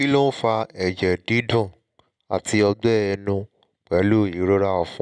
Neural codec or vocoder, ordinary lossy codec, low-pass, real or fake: none; none; 9.9 kHz; real